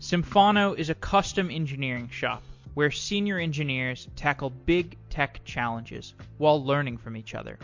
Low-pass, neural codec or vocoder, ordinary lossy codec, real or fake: 7.2 kHz; none; MP3, 48 kbps; real